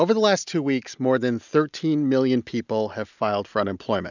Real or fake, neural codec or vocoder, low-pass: real; none; 7.2 kHz